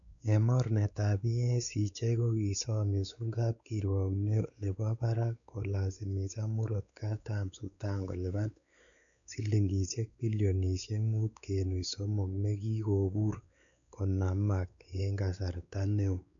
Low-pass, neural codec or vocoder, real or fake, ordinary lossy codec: 7.2 kHz; codec, 16 kHz, 4 kbps, X-Codec, WavLM features, trained on Multilingual LibriSpeech; fake; none